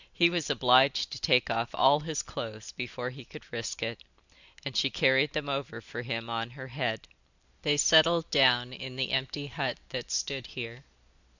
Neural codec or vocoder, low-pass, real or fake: none; 7.2 kHz; real